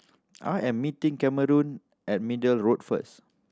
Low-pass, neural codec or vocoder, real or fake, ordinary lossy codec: none; none; real; none